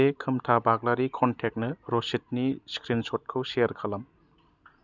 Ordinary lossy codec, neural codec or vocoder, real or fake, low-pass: none; none; real; 7.2 kHz